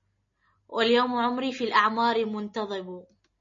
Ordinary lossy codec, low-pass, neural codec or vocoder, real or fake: MP3, 32 kbps; 7.2 kHz; none; real